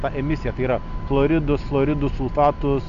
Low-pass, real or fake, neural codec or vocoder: 7.2 kHz; real; none